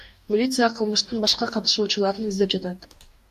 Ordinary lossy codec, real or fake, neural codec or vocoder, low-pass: AAC, 96 kbps; fake; codec, 44.1 kHz, 2.6 kbps, DAC; 14.4 kHz